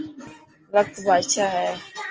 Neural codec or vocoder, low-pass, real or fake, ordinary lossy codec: none; 7.2 kHz; real; Opus, 24 kbps